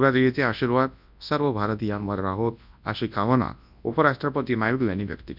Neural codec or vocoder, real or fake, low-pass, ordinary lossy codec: codec, 24 kHz, 0.9 kbps, WavTokenizer, large speech release; fake; 5.4 kHz; none